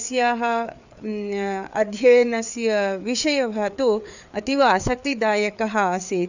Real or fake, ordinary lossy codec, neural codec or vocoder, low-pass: fake; none; codec, 16 kHz, 4 kbps, FreqCodec, larger model; 7.2 kHz